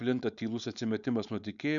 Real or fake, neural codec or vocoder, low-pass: fake; codec, 16 kHz, 4.8 kbps, FACodec; 7.2 kHz